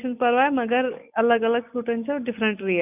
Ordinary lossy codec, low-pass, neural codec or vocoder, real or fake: none; 3.6 kHz; none; real